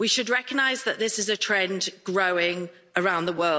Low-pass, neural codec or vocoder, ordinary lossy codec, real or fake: none; none; none; real